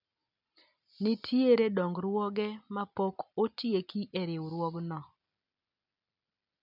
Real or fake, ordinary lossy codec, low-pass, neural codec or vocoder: real; none; 5.4 kHz; none